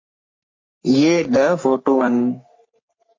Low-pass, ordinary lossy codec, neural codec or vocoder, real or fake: 7.2 kHz; MP3, 32 kbps; codec, 44.1 kHz, 3.4 kbps, Pupu-Codec; fake